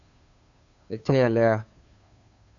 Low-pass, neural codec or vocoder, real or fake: 7.2 kHz; codec, 16 kHz, 2 kbps, FunCodec, trained on Chinese and English, 25 frames a second; fake